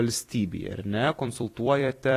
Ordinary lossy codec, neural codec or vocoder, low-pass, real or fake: AAC, 48 kbps; vocoder, 44.1 kHz, 128 mel bands every 256 samples, BigVGAN v2; 14.4 kHz; fake